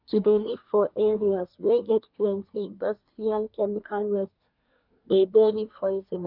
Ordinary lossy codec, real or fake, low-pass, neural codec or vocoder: none; fake; 5.4 kHz; codec, 24 kHz, 1 kbps, SNAC